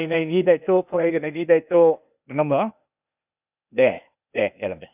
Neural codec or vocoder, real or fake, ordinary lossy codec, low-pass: codec, 16 kHz, 0.8 kbps, ZipCodec; fake; none; 3.6 kHz